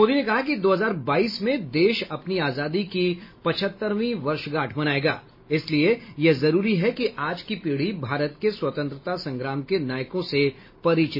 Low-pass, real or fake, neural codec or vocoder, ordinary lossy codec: 5.4 kHz; real; none; MP3, 24 kbps